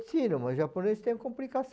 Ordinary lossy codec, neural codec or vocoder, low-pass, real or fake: none; none; none; real